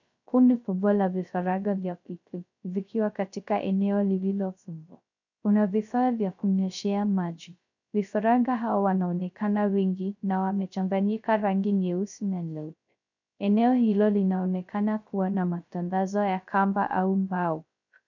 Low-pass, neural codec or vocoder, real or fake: 7.2 kHz; codec, 16 kHz, 0.3 kbps, FocalCodec; fake